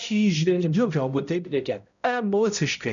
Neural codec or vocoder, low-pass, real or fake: codec, 16 kHz, 0.5 kbps, X-Codec, HuBERT features, trained on balanced general audio; 7.2 kHz; fake